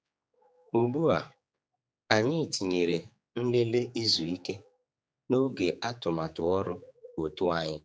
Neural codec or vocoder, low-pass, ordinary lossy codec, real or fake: codec, 16 kHz, 4 kbps, X-Codec, HuBERT features, trained on general audio; none; none; fake